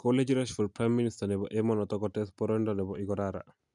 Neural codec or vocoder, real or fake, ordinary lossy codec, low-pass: none; real; none; 10.8 kHz